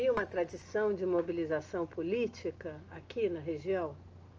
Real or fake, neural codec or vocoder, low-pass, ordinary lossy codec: real; none; 7.2 kHz; Opus, 24 kbps